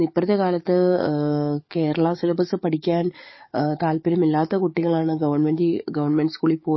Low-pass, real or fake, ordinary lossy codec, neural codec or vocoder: 7.2 kHz; fake; MP3, 24 kbps; codec, 44.1 kHz, 7.8 kbps, DAC